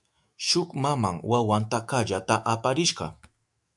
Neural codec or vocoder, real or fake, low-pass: autoencoder, 48 kHz, 128 numbers a frame, DAC-VAE, trained on Japanese speech; fake; 10.8 kHz